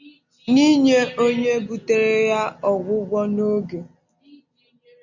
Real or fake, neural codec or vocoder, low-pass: real; none; 7.2 kHz